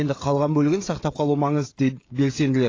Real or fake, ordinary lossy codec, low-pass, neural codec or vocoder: fake; AAC, 32 kbps; 7.2 kHz; codec, 16 kHz, 16 kbps, FreqCodec, smaller model